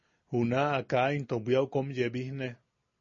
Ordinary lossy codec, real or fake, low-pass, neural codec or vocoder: MP3, 32 kbps; real; 7.2 kHz; none